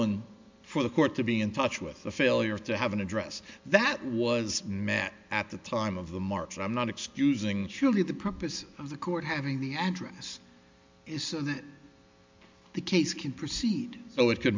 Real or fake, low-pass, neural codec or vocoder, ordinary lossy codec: real; 7.2 kHz; none; MP3, 64 kbps